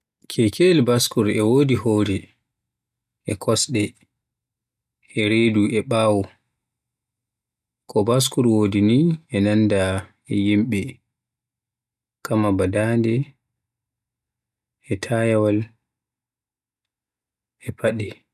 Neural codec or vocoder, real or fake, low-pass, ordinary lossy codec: none; real; 14.4 kHz; none